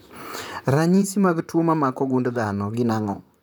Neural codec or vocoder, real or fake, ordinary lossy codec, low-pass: vocoder, 44.1 kHz, 128 mel bands, Pupu-Vocoder; fake; none; none